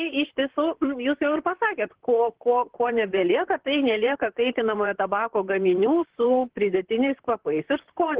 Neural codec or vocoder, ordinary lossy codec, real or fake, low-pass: vocoder, 44.1 kHz, 128 mel bands, Pupu-Vocoder; Opus, 16 kbps; fake; 3.6 kHz